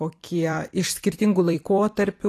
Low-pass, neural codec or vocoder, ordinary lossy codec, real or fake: 14.4 kHz; vocoder, 44.1 kHz, 128 mel bands every 256 samples, BigVGAN v2; AAC, 48 kbps; fake